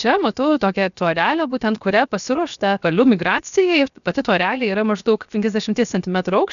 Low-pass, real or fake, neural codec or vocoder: 7.2 kHz; fake; codec, 16 kHz, 0.7 kbps, FocalCodec